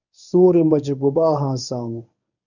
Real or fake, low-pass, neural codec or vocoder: fake; 7.2 kHz; codec, 24 kHz, 0.9 kbps, WavTokenizer, medium speech release version 1